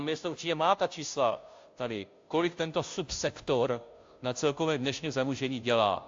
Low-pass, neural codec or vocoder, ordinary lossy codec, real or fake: 7.2 kHz; codec, 16 kHz, 0.5 kbps, FunCodec, trained on Chinese and English, 25 frames a second; AAC, 48 kbps; fake